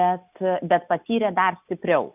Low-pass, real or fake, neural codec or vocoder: 3.6 kHz; real; none